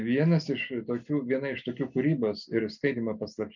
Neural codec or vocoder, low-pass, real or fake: none; 7.2 kHz; real